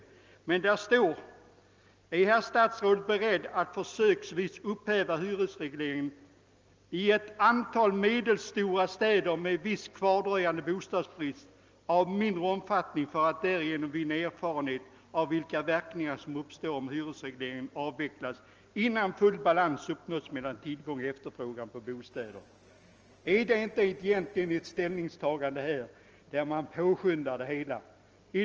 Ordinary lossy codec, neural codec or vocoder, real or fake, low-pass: Opus, 24 kbps; none; real; 7.2 kHz